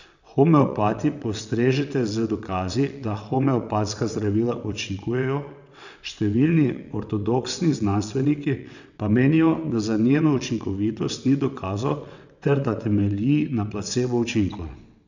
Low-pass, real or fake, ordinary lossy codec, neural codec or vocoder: 7.2 kHz; fake; none; vocoder, 22.05 kHz, 80 mel bands, WaveNeXt